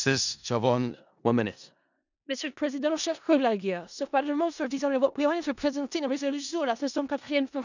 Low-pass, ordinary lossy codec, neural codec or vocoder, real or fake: 7.2 kHz; none; codec, 16 kHz in and 24 kHz out, 0.4 kbps, LongCat-Audio-Codec, four codebook decoder; fake